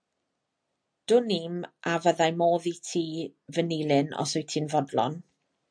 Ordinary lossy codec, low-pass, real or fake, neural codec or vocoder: MP3, 64 kbps; 9.9 kHz; real; none